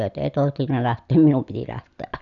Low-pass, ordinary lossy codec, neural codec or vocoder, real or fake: 7.2 kHz; none; none; real